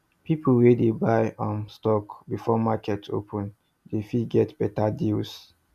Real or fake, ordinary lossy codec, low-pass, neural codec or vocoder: real; none; 14.4 kHz; none